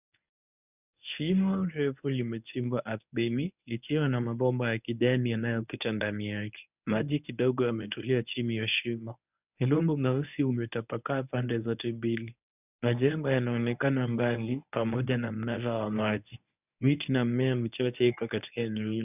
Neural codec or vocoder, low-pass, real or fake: codec, 24 kHz, 0.9 kbps, WavTokenizer, medium speech release version 1; 3.6 kHz; fake